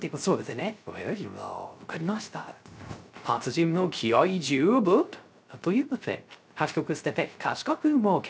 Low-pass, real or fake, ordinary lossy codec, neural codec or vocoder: none; fake; none; codec, 16 kHz, 0.3 kbps, FocalCodec